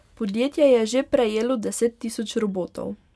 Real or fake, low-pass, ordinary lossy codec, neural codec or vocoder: real; none; none; none